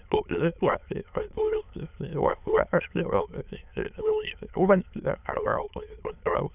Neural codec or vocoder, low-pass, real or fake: autoencoder, 22.05 kHz, a latent of 192 numbers a frame, VITS, trained on many speakers; 3.6 kHz; fake